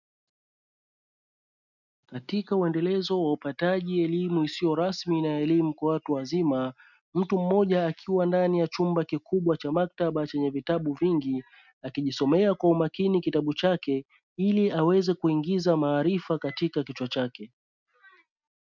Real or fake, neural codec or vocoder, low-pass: real; none; 7.2 kHz